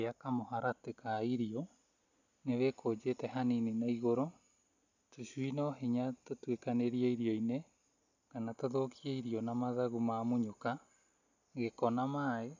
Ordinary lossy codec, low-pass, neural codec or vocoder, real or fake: none; 7.2 kHz; none; real